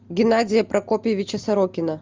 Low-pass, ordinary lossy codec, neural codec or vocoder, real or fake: 7.2 kHz; Opus, 32 kbps; none; real